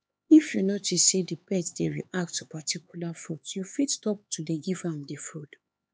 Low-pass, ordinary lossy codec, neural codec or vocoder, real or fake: none; none; codec, 16 kHz, 4 kbps, X-Codec, HuBERT features, trained on LibriSpeech; fake